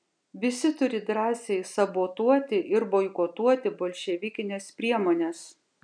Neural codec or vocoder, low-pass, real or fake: none; 9.9 kHz; real